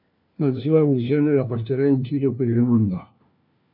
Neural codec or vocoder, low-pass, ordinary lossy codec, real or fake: codec, 16 kHz, 1 kbps, FunCodec, trained on LibriTTS, 50 frames a second; 5.4 kHz; MP3, 48 kbps; fake